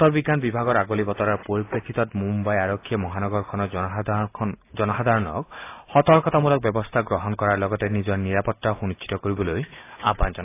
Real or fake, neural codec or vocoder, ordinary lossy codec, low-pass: real; none; AAC, 24 kbps; 3.6 kHz